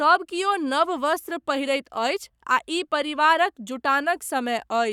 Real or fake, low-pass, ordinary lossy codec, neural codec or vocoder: fake; 19.8 kHz; none; codec, 44.1 kHz, 7.8 kbps, Pupu-Codec